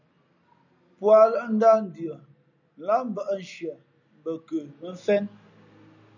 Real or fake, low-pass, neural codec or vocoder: real; 7.2 kHz; none